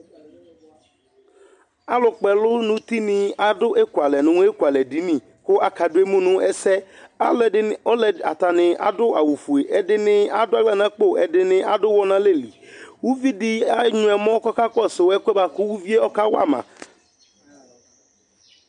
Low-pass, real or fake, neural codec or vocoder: 10.8 kHz; real; none